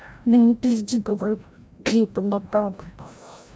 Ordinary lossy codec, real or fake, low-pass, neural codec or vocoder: none; fake; none; codec, 16 kHz, 0.5 kbps, FreqCodec, larger model